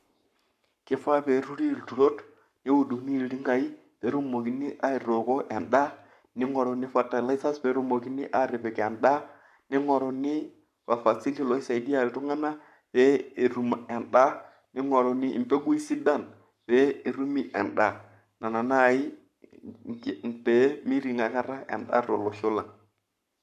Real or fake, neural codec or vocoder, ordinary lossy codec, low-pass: fake; codec, 44.1 kHz, 7.8 kbps, Pupu-Codec; none; 14.4 kHz